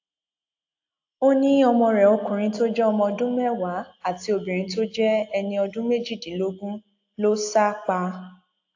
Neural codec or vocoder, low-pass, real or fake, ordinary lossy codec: none; 7.2 kHz; real; AAC, 48 kbps